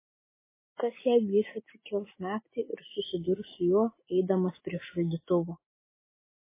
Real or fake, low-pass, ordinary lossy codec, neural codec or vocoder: real; 3.6 kHz; MP3, 16 kbps; none